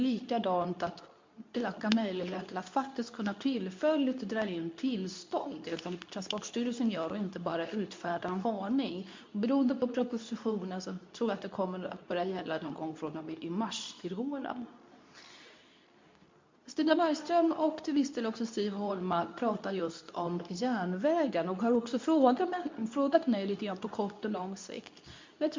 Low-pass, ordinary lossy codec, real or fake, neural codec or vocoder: 7.2 kHz; MP3, 48 kbps; fake; codec, 24 kHz, 0.9 kbps, WavTokenizer, medium speech release version 2